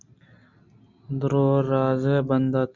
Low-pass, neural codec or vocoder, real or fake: 7.2 kHz; none; real